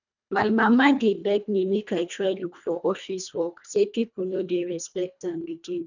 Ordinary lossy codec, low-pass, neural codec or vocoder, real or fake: none; 7.2 kHz; codec, 24 kHz, 1.5 kbps, HILCodec; fake